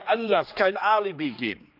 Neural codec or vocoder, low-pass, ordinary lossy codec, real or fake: codec, 16 kHz, 2 kbps, X-Codec, HuBERT features, trained on general audio; 5.4 kHz; none; fake